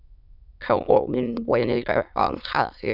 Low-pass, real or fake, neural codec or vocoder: 5.4 kHz; fake; autoencoder, 22.05 kHz, a latent of 192 numbers a frame, VITS, trained on many speakers